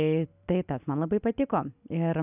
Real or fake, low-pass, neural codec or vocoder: real; 3.6 kHz; none